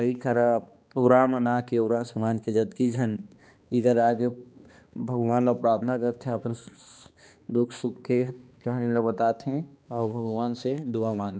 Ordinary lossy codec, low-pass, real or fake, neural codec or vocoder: none; none; fake; codec, 16 kHz, 2 kbps, X-Codec, HuBERT features, trained on balanced general audio